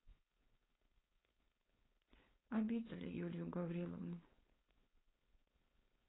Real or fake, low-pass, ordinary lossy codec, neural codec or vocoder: fake; 7.2 kHz; AAC, 16 kbps; codec, 16 kHz, 4.8 kbps, FACodec